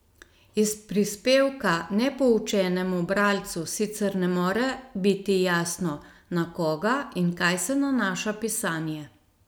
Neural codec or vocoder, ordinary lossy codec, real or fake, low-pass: none; none; real; none